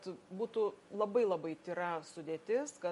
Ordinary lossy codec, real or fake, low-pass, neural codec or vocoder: MP3, 48 kbps; real; 14.4 kHz; none